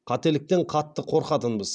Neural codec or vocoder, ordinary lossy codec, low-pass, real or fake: none; none; 9.9 kHz; real